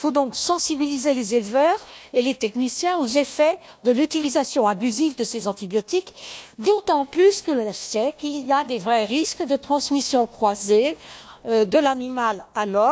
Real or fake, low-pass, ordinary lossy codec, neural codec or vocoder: fake; none; none; codec, 16 kHz, 1 kbps, FunCodec, trained on Chinese and English, 50 frames a second